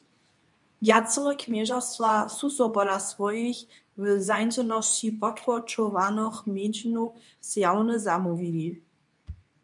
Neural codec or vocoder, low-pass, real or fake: codec, 24 kHz, 0.9 kbps, WavTokenizer, medium speech release version 2; 10.8 kHz; fake